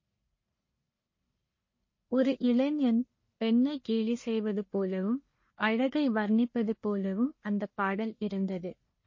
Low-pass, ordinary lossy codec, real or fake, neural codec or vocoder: 7.2 kHz; MP3, 32 kbps; fake; codec, 44.1 kHz, 1.7 kbps, Pupu-Codec